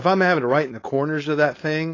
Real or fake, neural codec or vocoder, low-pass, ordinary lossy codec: fake; codec, 16 kHz, 4.8 kbps, FACodec; 7.2 kHz; AAC, 32 kbps